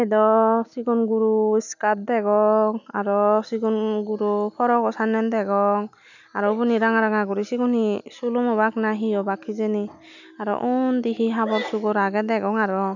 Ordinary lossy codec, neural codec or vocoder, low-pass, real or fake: none; none; 7.2 kHz; real